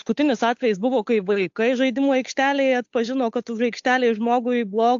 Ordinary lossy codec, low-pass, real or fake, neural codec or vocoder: AAC, 64 kbps; 7.2 kHz; fake; codec, 16 kHz, 8 kbps, FunCodec, trained on Chinese and English, 25 frames a second